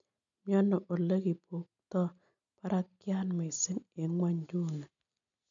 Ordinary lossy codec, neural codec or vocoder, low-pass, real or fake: none; none; 7.2 kHz; real